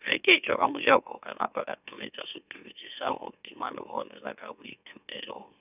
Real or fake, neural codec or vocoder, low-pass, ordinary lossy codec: fake; autoencoder, 44.1 kHz, a latent of 192 numbers a frame, MeloTTS; 3.6 kHz; none